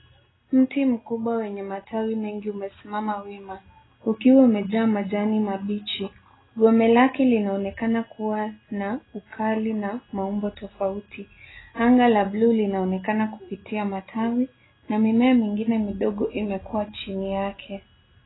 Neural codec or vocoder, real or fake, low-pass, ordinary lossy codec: none; real; 7.2 kHz; AAC, 16 kbps